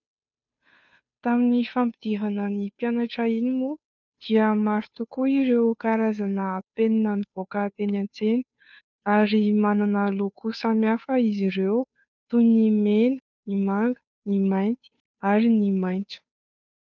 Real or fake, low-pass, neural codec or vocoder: fake; 7.2 kHz; codec, 16 kHz, 2 kbps, FunCodec, trained on Chinese and English, 25 frames a second